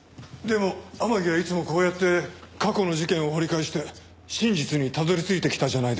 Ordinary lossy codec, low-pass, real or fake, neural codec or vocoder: none; none; real; none